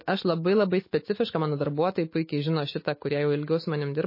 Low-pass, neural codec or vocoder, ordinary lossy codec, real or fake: 5.4 kHz; autoencoder, 48 kHz, 128 numbers a frame, DAC-VAE, trained on Japanese speech; MP3, 24 kbps; fake